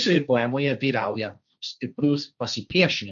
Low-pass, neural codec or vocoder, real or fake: 7.2 kHz; codec, 16 kHz, 1.1 kbps, Voila-Tokenizer; fake